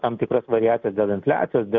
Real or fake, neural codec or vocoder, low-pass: real; none; 7.2 kHz